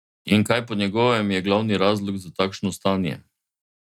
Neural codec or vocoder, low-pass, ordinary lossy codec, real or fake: none; 19.8 kHz; none; real